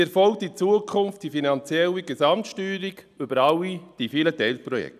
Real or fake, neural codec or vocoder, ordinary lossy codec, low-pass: real; none; none; 14.4 kHz